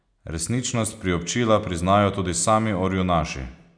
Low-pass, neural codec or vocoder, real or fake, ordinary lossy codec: 9.9 kHz; none; real; none